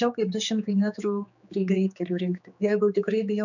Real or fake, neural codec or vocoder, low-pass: fake; codec, 16 kHz, 4 kbps, X-Codec, HuBERT features, trained on general audio; 7.2 kHz